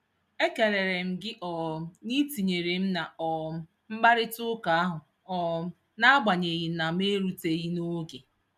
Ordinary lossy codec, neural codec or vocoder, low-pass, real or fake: none; none; 14.4 kHz; real